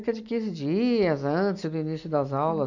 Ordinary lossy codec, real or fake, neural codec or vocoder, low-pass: none; real; none; 7.2 kHz